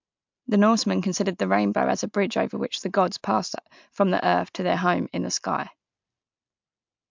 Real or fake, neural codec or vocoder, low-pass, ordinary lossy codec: real; none; 7.2 kHz; MP3, 64 kbps